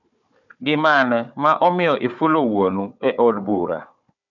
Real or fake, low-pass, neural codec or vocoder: fake; 7.2 kHz; codec, 16 kHz, 4 kbps, FunCodec, trained on Chinese and English, 50 frames a second